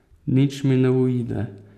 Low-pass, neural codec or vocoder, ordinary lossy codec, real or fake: 14.4 kHz; none; none; real